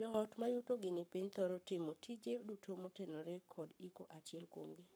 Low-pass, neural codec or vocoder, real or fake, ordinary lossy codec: none; codec, 44.1 kHz, 7.8 kbps, Pupu-Codec; fake; none